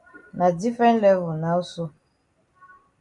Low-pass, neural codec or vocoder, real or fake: 10.8 kHz; none; real